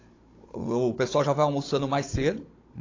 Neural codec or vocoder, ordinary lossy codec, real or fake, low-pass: codec, 16 kHz, 16 kbps, FunCodec, trained on Chinese and English, 50 frames a second; AAC, 32 kbps; fake; 7.2 kHz